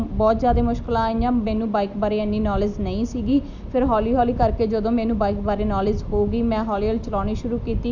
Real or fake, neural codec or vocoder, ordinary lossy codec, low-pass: real; none; none; 7.2 kHz